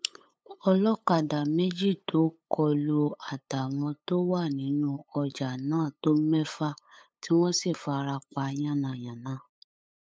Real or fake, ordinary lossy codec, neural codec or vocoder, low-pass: fake; none; codec, 16 kHz, 8 kbps, FunCodec, trained on LibriTTS, 25 frames a second; none